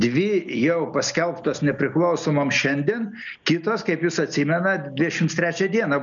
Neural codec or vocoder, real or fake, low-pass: none; real; 7.2 kHz